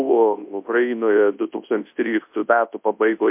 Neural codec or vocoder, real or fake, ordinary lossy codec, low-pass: codec, 24 kHz, 0.9 kbps, WavTokenizer, large speech release; fake; MP3, 32 kbps; 3.6 kHz